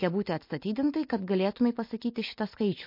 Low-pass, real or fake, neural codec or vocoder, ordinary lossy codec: 5.4 kHz; real; none; MP3, 32 kbps